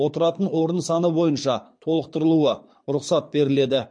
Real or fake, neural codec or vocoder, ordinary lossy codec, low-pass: fake; codec, 24 kHz, 6 kbps, HILCodec; MP3, 48 kbps; 9.9 kHz